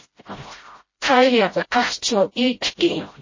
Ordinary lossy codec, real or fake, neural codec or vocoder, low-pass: MP3, 32 kbps; fake; codec, 16 kHz, 0.5 kbps, FreqCodec, smaller model; 7.2 kHz